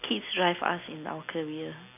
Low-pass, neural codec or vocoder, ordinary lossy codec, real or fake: 3.6 kHz; none; none; real